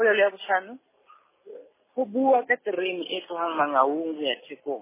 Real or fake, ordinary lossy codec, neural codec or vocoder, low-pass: real; MP3, 16 kbps; none; 3.6 kHz